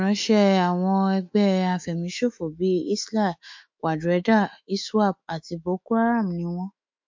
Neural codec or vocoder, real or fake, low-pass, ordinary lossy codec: autoencoder, 48 kHz, 128 numbers a frame, DAC-VAE, trained on Japanese speech; fake; 7.2 kHz; MP3, 48 kbps